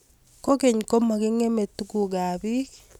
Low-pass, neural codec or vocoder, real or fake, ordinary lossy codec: 19.8 kHz; none; real; none